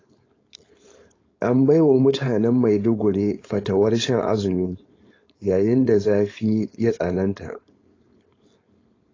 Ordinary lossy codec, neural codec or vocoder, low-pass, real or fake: AAC, 32 kbps; codec, 16 kHz, 4.8 kbps, FACodec; 7.2 kHz; fake